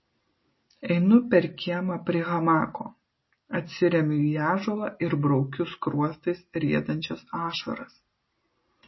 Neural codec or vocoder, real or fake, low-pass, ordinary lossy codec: none; real; 7.2 kHz; MP3, 24 kbps